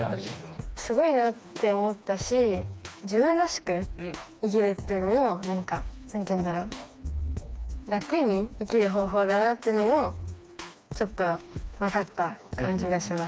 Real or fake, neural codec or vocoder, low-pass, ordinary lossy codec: fake; codec, 16 kHz, 2 kbps, FreqCodec, smaller model; none; none